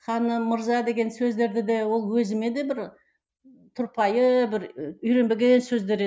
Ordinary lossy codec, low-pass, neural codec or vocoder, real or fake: none; none; none; real